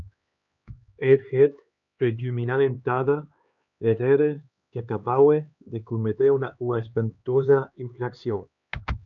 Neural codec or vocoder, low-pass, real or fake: codec, 16 kHz, 2 kbps, X-Codec, HuBERT features, trained on LibriSpeech; 7.2 kHz; fake